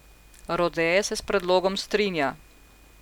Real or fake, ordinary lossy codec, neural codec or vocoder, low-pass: real; none; none; 19.8 kHz